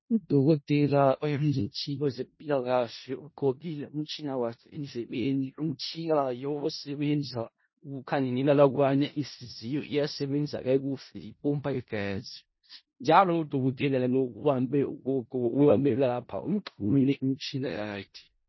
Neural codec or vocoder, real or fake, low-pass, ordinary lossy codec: codec, 16 kHz in and 24 kHz out, 0.4 kbps, LongCat-Audio-Codec, four codebook decoder; fake; 7.2 kHz; MP3, 24 kbps